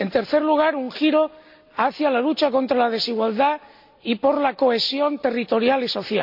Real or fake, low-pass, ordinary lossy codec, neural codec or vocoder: real; 5.4 kHz; MP3, 48 kbps; none